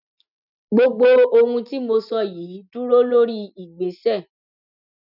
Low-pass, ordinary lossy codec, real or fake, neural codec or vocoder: 5.4 kHz; none; real; none